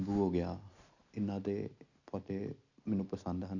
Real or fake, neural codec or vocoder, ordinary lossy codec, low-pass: real; none; none; 7.2 kHz